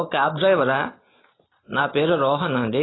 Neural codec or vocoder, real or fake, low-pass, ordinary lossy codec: none; real; 7.2 kHz; AAC, 16 kbps